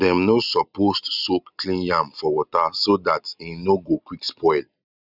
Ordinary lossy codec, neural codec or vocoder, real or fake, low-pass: none; none; real; 5.4 kHz